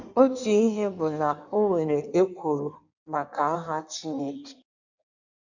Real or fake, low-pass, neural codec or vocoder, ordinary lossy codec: fake; 7.2 kHz; codec, 16 kHz in and 24 kHz out, 1.1 kbps, FireRedTTS-2 codec; none